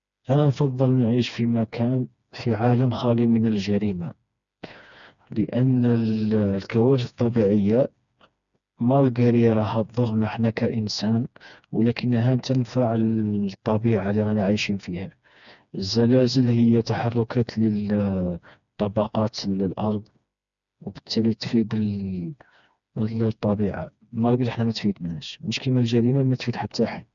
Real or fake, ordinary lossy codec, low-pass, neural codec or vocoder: fake; none; 7.2 kHz; codec, 16 kHz, 2 kbps, FreqCodec, smaller model